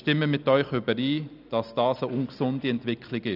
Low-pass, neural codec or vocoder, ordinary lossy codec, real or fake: 5.4 kHz; none; none; real